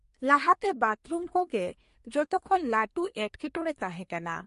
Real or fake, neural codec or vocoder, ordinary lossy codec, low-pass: fake; codec, 24 kHz, 1 kbps, SNAC; MP3, 48 kbps; 10.8 kHz